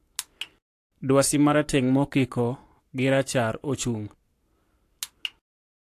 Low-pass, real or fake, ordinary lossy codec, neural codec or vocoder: 14.4 kHz; fake; AAC, 64 kbps; codec, 44.1 kHz, 7.8 kbps, Pupu-Codec